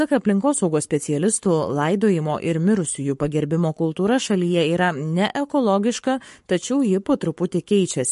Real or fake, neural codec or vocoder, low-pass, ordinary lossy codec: fake; codec, 44.1 kHz, 7.8 kbps, Pupu-Codec; 14.4 kHz; MP3, 48 kbps